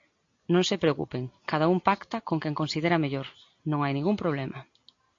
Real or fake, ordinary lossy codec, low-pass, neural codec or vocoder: real; AAC, 48 kbps; 7.2 kHz; none